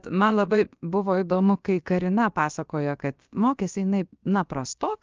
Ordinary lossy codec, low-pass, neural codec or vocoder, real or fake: Opus, 24 kbps; 7.2 kHz; codec, 16 kHz, about 1 kbps, DyCAST, with the encoder's durations; fake